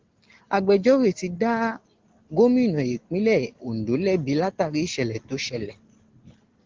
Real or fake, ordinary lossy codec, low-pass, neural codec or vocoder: real; Opus, 16 kbps; 7.2 kHz; none